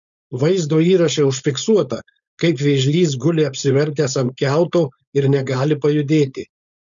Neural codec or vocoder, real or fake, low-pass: codec, 16 kHz, 4.8 kbps, FACodec; fake; 7.2 kHz